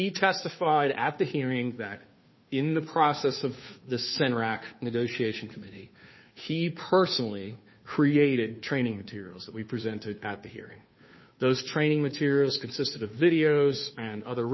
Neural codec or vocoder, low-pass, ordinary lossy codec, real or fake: codec, 16 kHz, 2 kbps, FunCodec, trained on Chinese and English, 25 frames a second; 7.2 kHz; MP3, 24 kbps; fake